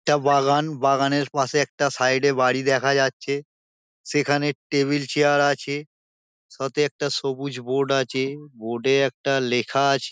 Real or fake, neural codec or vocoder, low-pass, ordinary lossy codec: real; none; none; none